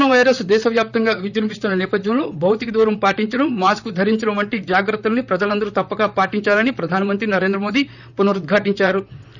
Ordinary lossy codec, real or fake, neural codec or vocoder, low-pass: none; fake; vocoder, 44.1 kHz, 128 mel bands, Pupu-Vocoder; 7.2 kHz